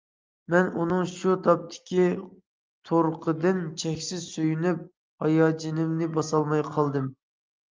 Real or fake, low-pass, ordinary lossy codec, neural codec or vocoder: real; 7.2 kHz; Opus, 24 kbps; none